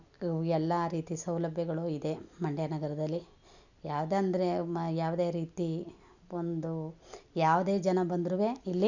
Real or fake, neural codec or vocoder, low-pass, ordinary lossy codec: real; none; 7.2 kHz; none